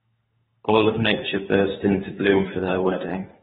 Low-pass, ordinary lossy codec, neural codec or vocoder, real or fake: 10.8 kHz; AAC, 16 kbps; codec, 24 kHz, 3 kbps, HILCodec; fake